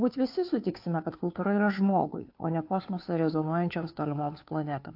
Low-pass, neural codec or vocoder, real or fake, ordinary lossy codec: 5.4 kHz; codec, 16 kHz, 2 kbps, FunCodec, trained on Chinese and English, 25 frames a second; fake; AAC, 32 kbps